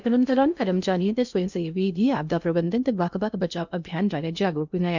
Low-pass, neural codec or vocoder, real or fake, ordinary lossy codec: 7.2 kHz; codec, 16 kHz in and 24 kHz out, 0.6 kbps, FocalCodec, streaming, 2048 codes; fake; none